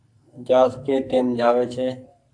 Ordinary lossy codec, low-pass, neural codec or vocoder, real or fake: AAC, 64 kbps; 9.9 kHz; codec, 44.1 kHz, 2.6 kbps, SNAC; fake